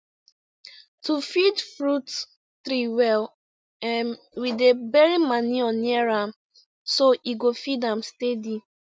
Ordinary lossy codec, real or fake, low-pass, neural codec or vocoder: none; real; none; none